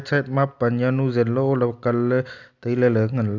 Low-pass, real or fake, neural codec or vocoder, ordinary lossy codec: 7.2 kHz; real; none; none